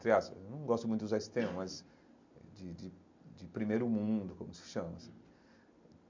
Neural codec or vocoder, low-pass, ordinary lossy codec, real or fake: none; 7.2 kHz; MP3, 48 kbps; real